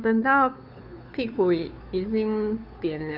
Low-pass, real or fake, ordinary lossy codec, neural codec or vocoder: 5.4 kHz; fake; none; codec, 16 kHz, 2 kbps, FunCodec, trained on Chinese and English, 25 frames a second